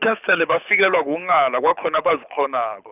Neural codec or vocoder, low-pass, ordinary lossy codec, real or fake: codec, 24 kHz, 6 kbps, HILCodec; 3.6 kHz; none; fake